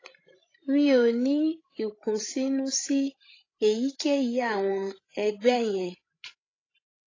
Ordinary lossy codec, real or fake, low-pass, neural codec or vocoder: AAC, 32 kbps; fake; 7.2 kHz; codec, 16 kHz, 16 kbps, FreqCodec, larger model